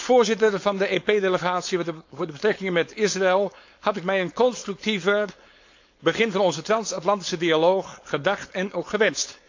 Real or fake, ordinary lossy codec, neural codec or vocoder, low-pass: fake; AAC, 48 kbps; codec, 16 kHz, 4.8 kbps, FACodec; 7.2 kHz